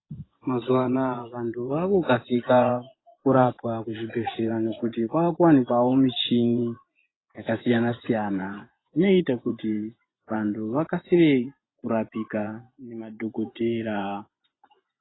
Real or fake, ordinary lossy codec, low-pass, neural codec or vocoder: fake; AAC, 16 kbps; 7.2 kHz; vocoder, 24 kHz, 100 mel bands, Vocos